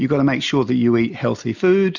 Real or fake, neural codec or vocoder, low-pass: real; none; 7.2 kHz